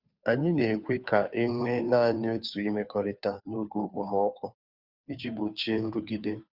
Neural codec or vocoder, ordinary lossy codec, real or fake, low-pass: codec, 16 kHz, 2 kbps, FunCodec, trained on Chinese and English, 25 frames a second; Opus, 64 kbps; fake; 5.4 kHz